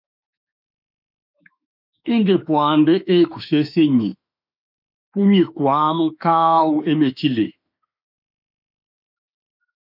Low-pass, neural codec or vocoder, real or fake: 5.4 kHz; autoencoder, 48 kHz, 32 numbers a frame, DAC-VAE, trained on Japanese speech; fake